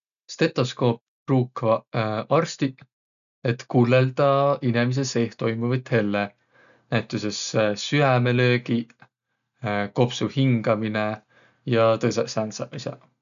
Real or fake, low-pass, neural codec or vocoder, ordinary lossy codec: real; 7.2 kHz; none; none